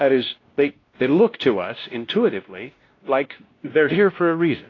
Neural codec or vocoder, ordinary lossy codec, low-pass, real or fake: codec, 16 kHz, 1 kbps, X-Codec, WavLM features, trained on Multilingual LibriSpeech; AAC, 32 kbps; 7.2 kHz; fake